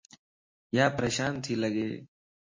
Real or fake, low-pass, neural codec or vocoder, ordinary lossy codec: real; 7.2 kHz; none; MP3, 32 kbps